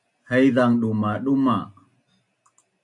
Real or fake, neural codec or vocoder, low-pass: real; none; 10.8 kHz